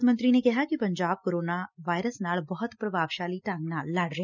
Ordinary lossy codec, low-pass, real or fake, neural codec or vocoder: none; 7.2 kHz; real; none